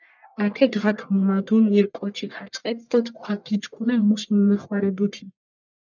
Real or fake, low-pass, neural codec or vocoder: fake; 7.2 kHz; codec, 44.1 kHz, 1.7 kbps, Pupu-Codec